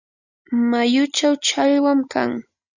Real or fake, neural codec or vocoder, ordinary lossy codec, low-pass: real; none; Opus, 64 kbps; 7.2 kHz